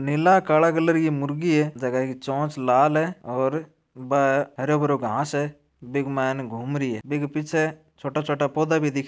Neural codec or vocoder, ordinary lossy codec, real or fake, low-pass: none; none; real; none